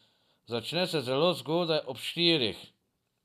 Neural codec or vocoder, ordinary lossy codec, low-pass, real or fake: none; none; 14.4 kHz; real